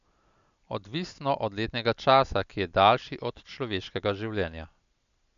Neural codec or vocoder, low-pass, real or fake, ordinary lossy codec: none; 7.2 kHz; real; none